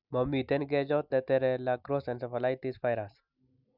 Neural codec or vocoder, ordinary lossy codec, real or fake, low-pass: none; none; real; 5.4 kHz